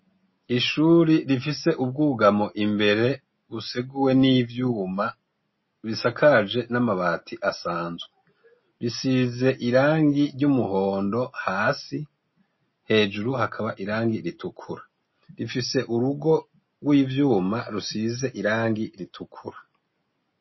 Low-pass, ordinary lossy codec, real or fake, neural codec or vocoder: 7.2 kHz; MP3, 24 kbps; real; none